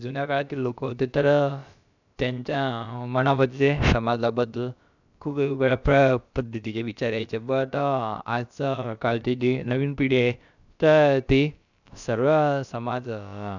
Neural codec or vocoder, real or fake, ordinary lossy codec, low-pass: codec, 16 kHz, about 1 kbps, DyCAST, with the encoder's durations; fake; none; 7.2 kHz